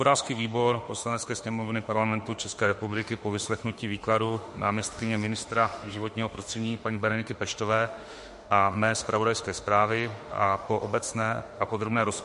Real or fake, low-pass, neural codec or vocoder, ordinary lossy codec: fake; 14.4 kHz; autoencoder, 48 kHz, 32 numbers a frame, DAC-VAE, trained on Japanese speech; MP3, 48 kbps